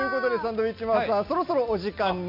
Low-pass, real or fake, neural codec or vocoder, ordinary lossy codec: 5.4 kHz; real; none; MP3, 48 kbps